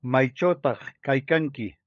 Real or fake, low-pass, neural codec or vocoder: fake; 7.2 kHz; codec, 16 kHz, 16 kbps, FunCodec, trained on LibriTTS, 50 frames a second